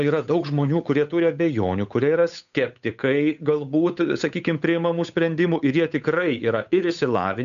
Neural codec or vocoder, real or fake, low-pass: codec, 16 kHz, 8 kbps, FunCodec, trained on Chinese and English, 25 frames a second; fake; 7.2 kHz